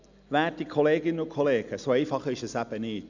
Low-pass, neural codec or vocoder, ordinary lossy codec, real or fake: 7.2 kHz; none; none; real